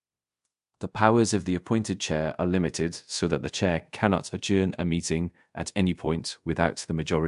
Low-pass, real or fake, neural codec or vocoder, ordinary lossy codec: 10.8 kHz; fake; codec, 24 kHz, 0.5 kbps, DualCodec; MP3, 64 kbps